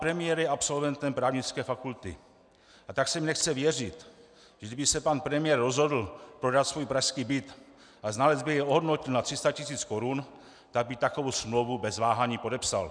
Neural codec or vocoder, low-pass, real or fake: none; 9.9 kHz; real